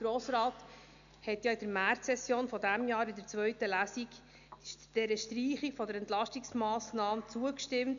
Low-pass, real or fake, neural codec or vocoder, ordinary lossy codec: 7.2 kHz; real; none; none